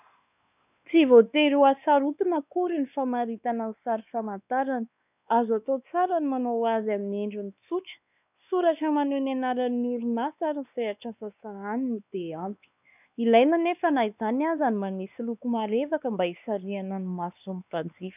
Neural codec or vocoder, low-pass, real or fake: codec, 16 kHz, 2 kbps, X-Codec, WavLM features, trained on Multilingual LibriSpeech; 3.6 kHz; fake